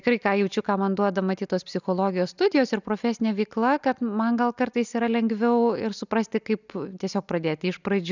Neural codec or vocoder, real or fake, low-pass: none; real; 7.2 kHz